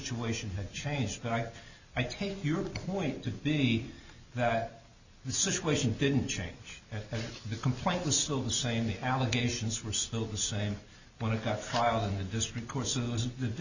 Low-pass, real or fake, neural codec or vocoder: 7.2 kHz; real; none